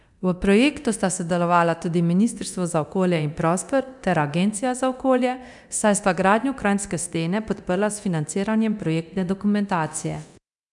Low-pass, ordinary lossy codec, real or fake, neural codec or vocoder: 10.8 kHz; none; fake; codec, 24 kHz, 0.9 kbps, DualCodec